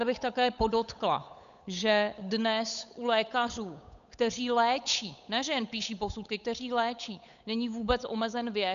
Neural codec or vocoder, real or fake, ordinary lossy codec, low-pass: codec, 16 kHz, 8 kbps, FunCodec, trained on Chinese and English, 25 frames a second; fake; MP3, 96 kbps; 7.2 kHz